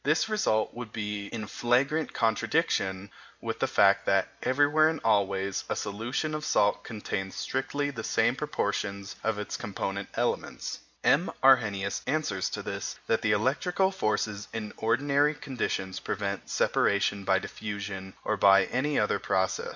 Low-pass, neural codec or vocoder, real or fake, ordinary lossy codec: 7.2 kHz; none; real; MP3, 64 kbps